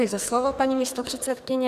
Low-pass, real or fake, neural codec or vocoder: 14.4 kHz; fake; codec, 44.1 kHz, 2.6 kbps, SNAC